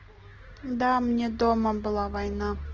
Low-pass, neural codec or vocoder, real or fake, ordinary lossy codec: 7.2 kHz; none; real; Opus, 16 kbps